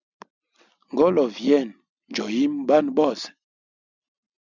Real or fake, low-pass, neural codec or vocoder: real; 7.2 kHz; none